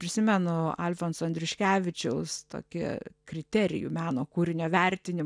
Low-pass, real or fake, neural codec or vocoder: 9.9 kHz; real; none